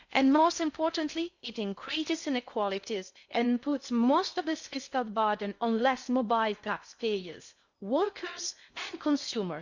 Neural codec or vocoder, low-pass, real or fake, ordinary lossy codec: codec, 16 kHz in and 24 kHz out, 0.6 kbps, FocalCodec, streaming, 2048 codes; 7.2 kHz; fake; Opus, 64 kbps